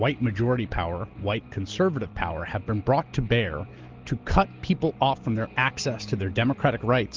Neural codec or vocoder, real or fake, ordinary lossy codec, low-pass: none; real; Opus, 16 kbps; 7.2 kHz